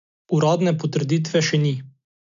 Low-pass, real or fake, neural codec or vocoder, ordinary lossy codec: 7.2 kHz; real; none; none